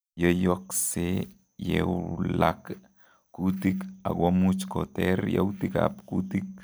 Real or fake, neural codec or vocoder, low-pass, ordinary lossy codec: real; none; none; none